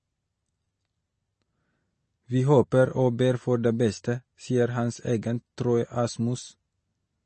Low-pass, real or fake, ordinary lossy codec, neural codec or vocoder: 10.8 kHz; real; MP3, 32 kbps; none